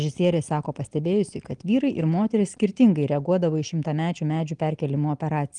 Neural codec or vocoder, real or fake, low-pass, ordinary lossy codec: none; real; 9.9 kHz; Opus, 24 kbps